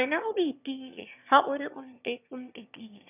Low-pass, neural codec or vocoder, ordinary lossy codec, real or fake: 3.6 kHz; autoencoder, 22.05 kHz, a latent of 192 numbers a frame, VITS, trained on one speaker; none; fake